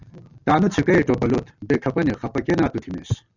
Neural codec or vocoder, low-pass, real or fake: none; 7.2 kHz; real